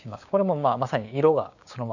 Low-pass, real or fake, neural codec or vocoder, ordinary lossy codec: 7.2 kHz; fake; codec, 16 kHz, 6 kbps, DAC; none